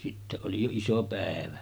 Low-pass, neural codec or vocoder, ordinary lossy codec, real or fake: none; none; none; real